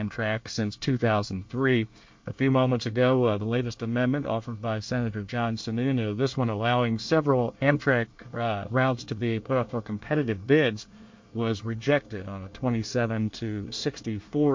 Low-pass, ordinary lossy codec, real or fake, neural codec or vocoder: 7.2 kHz; MP3, 48 kbps; fake; codec, 24 kHz, 1 kbps, SNAC